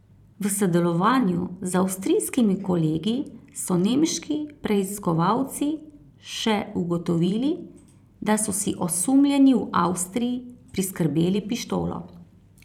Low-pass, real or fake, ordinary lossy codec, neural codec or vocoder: 19.8 kHz; fake; none; vocoder, 44.1 kHz, 128 mel bands every 512 samples, BigVGAN v2